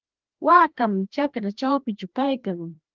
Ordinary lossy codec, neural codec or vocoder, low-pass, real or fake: Opus, 16 kbps; codec, 16 kHz, 1 kbps, FreqCodec, larger model; 7.2 kHz; fake